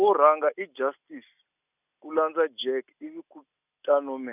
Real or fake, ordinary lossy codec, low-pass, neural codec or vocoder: real; none; 3.6 kHz; none